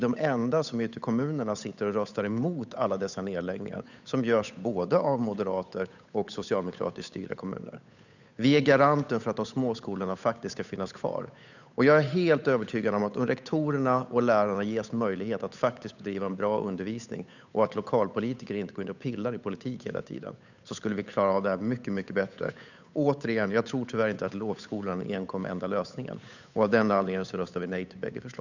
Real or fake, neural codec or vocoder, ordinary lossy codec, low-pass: fake; codec, 16 kHz, 8 kbps, FunCodec, trained on Chinese and English, 25 frames a second; none; 7.2 kHz